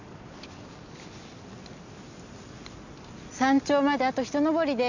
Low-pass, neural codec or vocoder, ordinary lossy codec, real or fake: 7.2 kHz; none; none; real